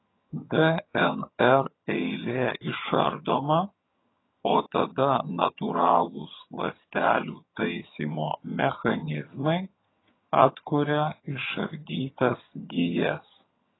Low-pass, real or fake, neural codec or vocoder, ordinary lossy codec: 7.2 kHz; fake; vocoder, 22.05 kHz, 80 mel bands, HiFi-GAN; AAC, 16 kbps